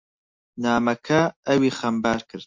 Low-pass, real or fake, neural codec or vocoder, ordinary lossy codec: 7.2 kHz; real; none; MP3, 48 kbps